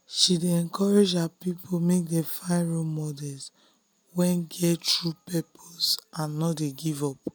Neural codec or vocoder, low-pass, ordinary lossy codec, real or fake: none; none; none; real